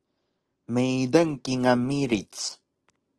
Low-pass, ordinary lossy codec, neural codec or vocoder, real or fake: 10.8 kHz; Opus, 16 kbps; none; real